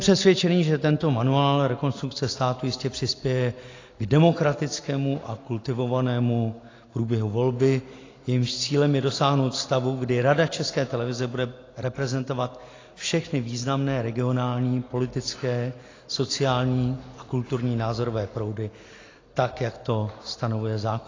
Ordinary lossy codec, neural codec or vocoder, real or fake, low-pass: AAC, 32 kbps; none; real; 7.2 kHz